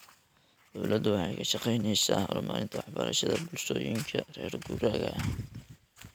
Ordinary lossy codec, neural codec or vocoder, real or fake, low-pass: none; none; real; none